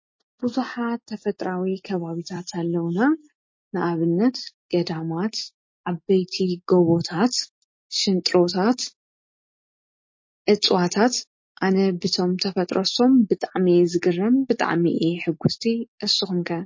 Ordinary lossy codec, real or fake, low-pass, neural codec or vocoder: MP3, 32 kbps; real; 7.2 kHz; none